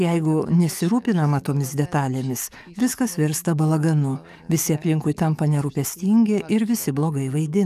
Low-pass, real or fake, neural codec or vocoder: 14.4 kHz; fake; codec, 44.1 kHz, 7.8 kbps, DAC